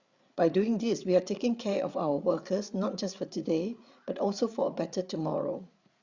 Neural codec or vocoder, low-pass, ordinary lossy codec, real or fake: codec, 16 kHz, 8 kbps, FreqCodec, larger model; 7.2 kHz; Opus, 64 kbps; fake